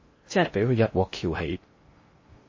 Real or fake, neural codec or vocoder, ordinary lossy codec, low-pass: fake; codec, 16 kHz in and 24 kHz out, 0.6 kbps, FocalCodec, streaming, 2048 codes; MP3, 32 kbps; 7.2 kHz